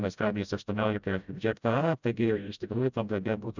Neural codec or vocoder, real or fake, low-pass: codec, 16 kHz, 0.5 kbps, FreqCodec, smaller model; fake; 7.2 kHz